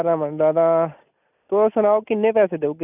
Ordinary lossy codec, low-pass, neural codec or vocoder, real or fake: none; 3.6 kHz; none; real